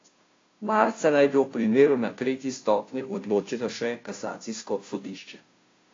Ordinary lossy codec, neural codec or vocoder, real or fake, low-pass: AAC, 32 kbps; codec, 16 kHz, 0.5 kbps, FunCodec, trained on Chinese and English, 25 frames a second; fake; 7.2 kHz